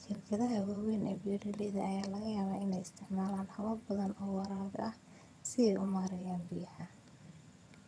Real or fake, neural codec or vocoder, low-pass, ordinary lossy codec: fake; vocoder, 22.05 kHz, 80 mel bands, HiFi-GAN; none; none